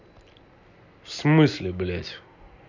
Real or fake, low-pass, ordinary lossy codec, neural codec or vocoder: real; 7.2 kHz; none; none